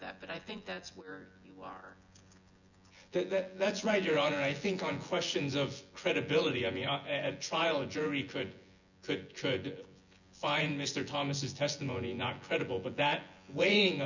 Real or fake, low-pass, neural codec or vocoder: fake; 7.2 kHz; vocoder, 24 kHz, 100 mel bands, Vocos